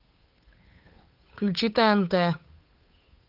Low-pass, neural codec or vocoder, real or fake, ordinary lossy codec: 5.4 kHz; codec, 16 kHz, 8 kbps, FunCodec, trained on Chinese and English, 25 frames a second; fake; Opus, 32 kbps